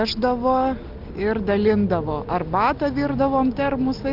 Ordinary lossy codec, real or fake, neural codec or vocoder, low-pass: Opus, 24 kbps; real; none; 5.4 kHz